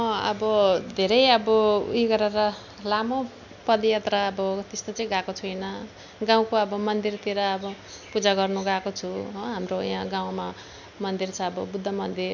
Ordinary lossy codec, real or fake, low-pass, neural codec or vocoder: none; real; 7.2 kHz; none